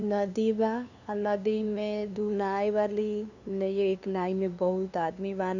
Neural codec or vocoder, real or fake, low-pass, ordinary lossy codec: codec, 16 kHz, 2 kbps, FunCodec, trained on LibriTTS, 25 frames a second; fake; 7.2 kHz; none